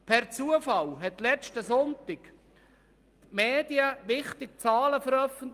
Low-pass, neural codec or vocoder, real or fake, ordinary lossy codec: 14.4 kHz; none; real; Opus, 32 kbps